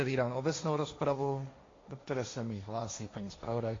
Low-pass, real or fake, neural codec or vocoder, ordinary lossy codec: 7.2 kHz; fake; codec, 16 kHz, 1.1 kbps, Voila-Tokenizer; AAC, 32 kbps